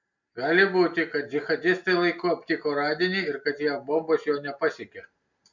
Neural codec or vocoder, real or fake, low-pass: none; real; 7.2 kHz